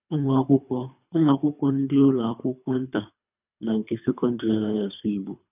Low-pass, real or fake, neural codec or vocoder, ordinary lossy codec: 3.6 kHz; fake; codec, 24 kHz, 3 kbps, HILCodec; none